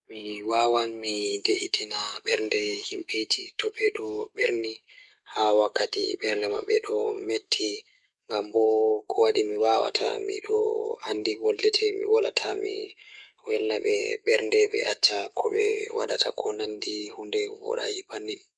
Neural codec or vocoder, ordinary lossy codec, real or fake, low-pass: codec, 44.1 kHz, 7.8 kbps, DAC; MP3, 96 kbps; fake; 10.8 kHz